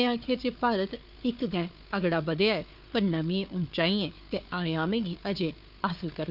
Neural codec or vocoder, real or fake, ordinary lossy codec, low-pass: codec, 16 kHz, 4 kbps, FunCodec, trained on Chinese and English, 50 frames a second; fake; none; 5.4 kHz